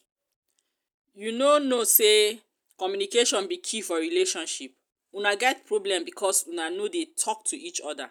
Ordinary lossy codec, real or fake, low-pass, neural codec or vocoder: none; real; none; none